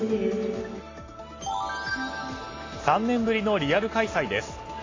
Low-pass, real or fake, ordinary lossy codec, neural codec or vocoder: 7.2 kHz; real; AAC, 32 kbps; none